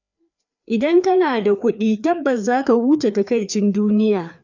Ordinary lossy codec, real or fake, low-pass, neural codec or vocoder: none; fake; 7.2 kHz; codec, 16 kHz, 2 kbps, FreqCodec, larger model